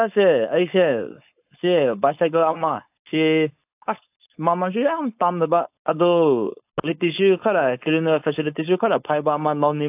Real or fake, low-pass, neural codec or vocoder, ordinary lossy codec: fake; 3.6 kHz; codec, 16 kHz, 4.8 kbps, FACodec; AAC, 32 kbps